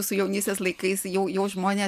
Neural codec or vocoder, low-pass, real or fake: none; 14.4 kHz; real